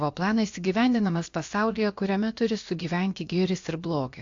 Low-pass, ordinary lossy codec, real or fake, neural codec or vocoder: 7.2 kHz; AAC, 64 kbps; fake; codec, 16 kHz, about 1 kbps, DyCAST, with the encoder's durations